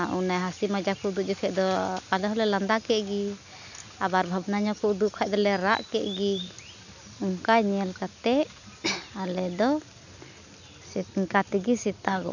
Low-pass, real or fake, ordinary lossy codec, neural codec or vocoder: 7.2 kHz; real; none; none